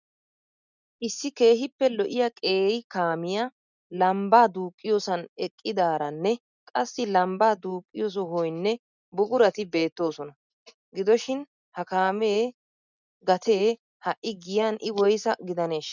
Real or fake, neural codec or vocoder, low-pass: real; none; 7.2 kHz